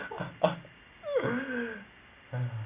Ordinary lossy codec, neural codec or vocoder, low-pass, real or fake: Opus, 64 kbps; none; 3.6 kHz; real